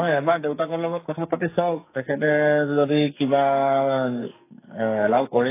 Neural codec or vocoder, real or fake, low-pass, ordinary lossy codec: codec, 44.1 kHz, 2.6 kbps, SNAC; fake; 3.6 kHz; AAC, 24 kbps